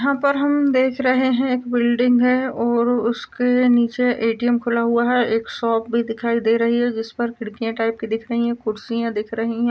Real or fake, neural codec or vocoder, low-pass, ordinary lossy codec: real; none; none; none